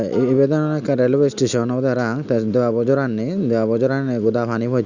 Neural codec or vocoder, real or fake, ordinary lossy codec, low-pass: none; real; Opus, 64 kbps; 7.2 kHz